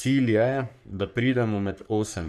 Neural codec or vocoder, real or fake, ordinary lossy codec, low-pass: codec, 44.1 kHz, 3.4 kbps, Pupu-Codec; fake; none; 14.4 kHz